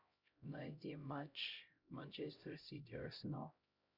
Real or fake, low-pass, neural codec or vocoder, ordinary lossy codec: fake; 5.4 kHz; codec, 16 kHz, 0.5 kbps, X-Codec, HuBERT features, trained on LibriSpeech; Opus, 64 kbps